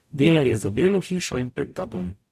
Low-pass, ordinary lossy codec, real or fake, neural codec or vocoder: 14.4 kHz; none; fake; codec, 44.1 kHz, 0.9 kbps, DAC